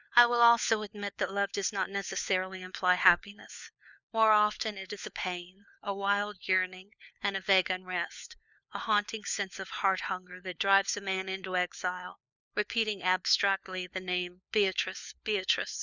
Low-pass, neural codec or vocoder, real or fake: 7.2 kHz; codec, 16 kHz, 4 kbps, FunCodec, trained on LibriTTS, 50 frames a second; fake